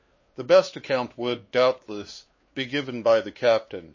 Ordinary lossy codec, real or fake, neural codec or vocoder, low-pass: MP3, 32 kbps; fake; codec, 16 kHz, 2 kbps, X-Codec, WavLM features, trained on Multilingual LibriSpeech; 7.2 kHz